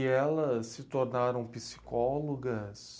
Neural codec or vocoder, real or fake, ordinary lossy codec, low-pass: none; real; none; none